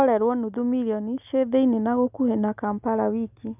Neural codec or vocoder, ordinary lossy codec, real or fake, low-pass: none; none; real; 3.6 kHz